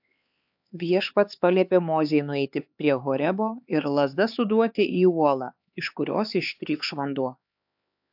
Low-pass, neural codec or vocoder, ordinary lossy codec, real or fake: 5.4 kHz; codec, 16 kHz, 4 kbps, X-Codec, HuBERT features, trained on LibriSpeech; AAC, 48 kbps; fake